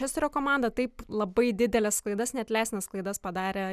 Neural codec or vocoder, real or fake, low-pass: none; real; 14.4 kHz